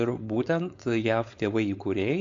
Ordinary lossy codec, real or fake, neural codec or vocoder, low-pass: MP3, 48 kbps; fake; codec, 16 kHz, 4.8 kbps, FACodec; 7.2 kHz